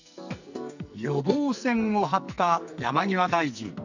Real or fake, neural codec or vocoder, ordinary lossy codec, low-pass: fake; codec, 44.1 kHz, 2.6 kbps, SNAC; none; 7.2 kHz